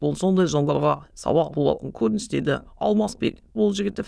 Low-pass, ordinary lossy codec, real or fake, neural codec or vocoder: none; none; fake; autoencoder, 22.05 kHz, a latent of 192 numbers a frame, VITS, trained on many speakers